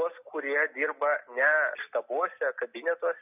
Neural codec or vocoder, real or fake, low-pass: none; real; 3.6 kHz